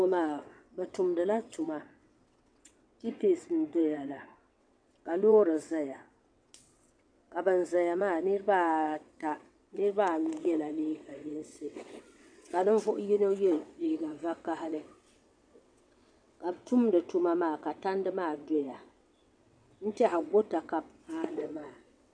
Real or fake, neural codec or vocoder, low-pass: fake; vocoder, 22.05 kHz, 80 mel bands, WaveNeXt; 9.9 kHz